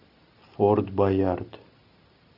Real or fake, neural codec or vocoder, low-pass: real; none; 5.4 kHz